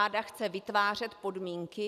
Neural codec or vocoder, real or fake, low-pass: none; real; 14.4 kHz